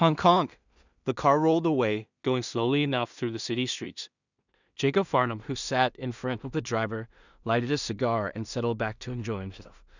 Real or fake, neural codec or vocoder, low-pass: fake; codec, 16 kHz in and 24 kHz out, 0.4 kbps, LongCat-Audio-Codec, two codebook decoder; 7.2 kHz